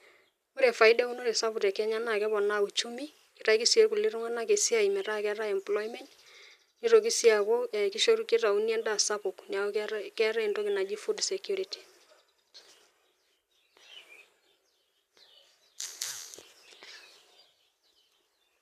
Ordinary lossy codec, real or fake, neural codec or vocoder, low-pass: none; real; none; 14.4 kHz